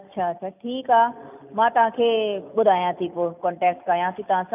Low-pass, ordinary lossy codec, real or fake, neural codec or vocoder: 3.6 kHz; none; real; none